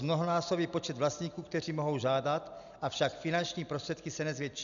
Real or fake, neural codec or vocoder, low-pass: real; none; 7.2 kHz